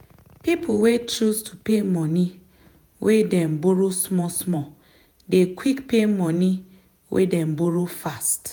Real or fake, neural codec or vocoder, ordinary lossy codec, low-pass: fake; vocoder, 48 kHz, 128 mel bands, Vocos; none; none